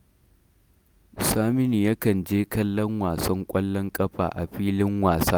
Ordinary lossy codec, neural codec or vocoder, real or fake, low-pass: none; none; real; none